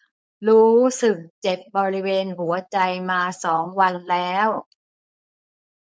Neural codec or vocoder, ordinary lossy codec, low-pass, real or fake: codec, 16 kHz, 4.8 kbps, FACodec; none; none; fake